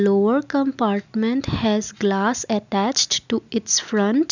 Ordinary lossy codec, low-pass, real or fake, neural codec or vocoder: none; 7.2 kHz; real; none